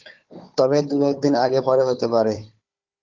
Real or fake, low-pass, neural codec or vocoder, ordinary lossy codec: fake; 7.2 kHz; codec, 16 kHz, 4 kbps, FunCodec, trained on Chinese and English, 50 frames a second; Opus, 32 kbps